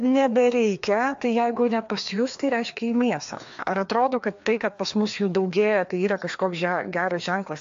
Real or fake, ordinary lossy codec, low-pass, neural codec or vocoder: fake; AAC, 64 kbps; 7.2 kHz; codec, 16 kHz, 2 kbps, FreqCodec, larger model